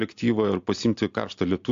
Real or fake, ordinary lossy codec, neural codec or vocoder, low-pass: real; AAC, 64 kbps; none; 7.2 kHz